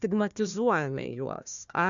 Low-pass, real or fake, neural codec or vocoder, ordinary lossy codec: 7.2 kHz; fake; codec, 16 kHz, 1 kbps, FunCodec, trained on Chinese and English, 50 frames a second; MP3, 96 kbps